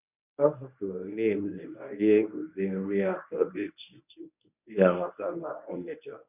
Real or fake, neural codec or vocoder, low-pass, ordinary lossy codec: fake; codec, 24 kHz, 0.9 kbps, WavTokenizer, medium speech release version 1; 3.6 kHz; none